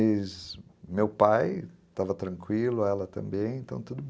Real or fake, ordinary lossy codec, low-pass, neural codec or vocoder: real; none; none; none